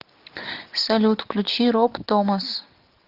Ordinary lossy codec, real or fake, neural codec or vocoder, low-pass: Opus, 24 kbps; real; none; 5.4 kHz